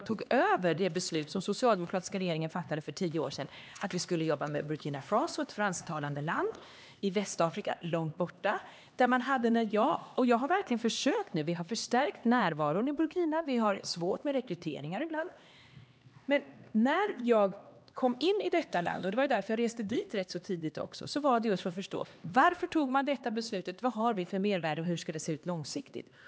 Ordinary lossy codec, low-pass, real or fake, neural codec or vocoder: none; none; fake; codec, 16 kHz, 2 kbps, X-Codec, HuBERT features, trained on LibriSpeech